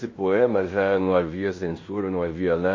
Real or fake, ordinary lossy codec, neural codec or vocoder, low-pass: fake; MP3, 32 kbps; codec, 16 kHz in and 24 kHz out, 0.9 kbps, LongCat-Audio-Codec, fine tuned four codebook decoder; 7.2 kHz